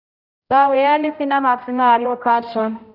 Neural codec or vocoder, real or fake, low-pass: codec, 16 kHz, 0.5 kbps, X-Codec, HuBERT features, trained on general audio; fake; 5.4 kHz